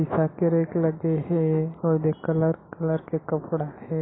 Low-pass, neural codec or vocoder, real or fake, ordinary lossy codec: 7.2 kHz; none; real; AAC, 16 kbps